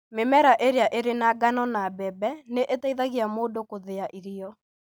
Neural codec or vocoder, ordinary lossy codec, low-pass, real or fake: none; none; none; real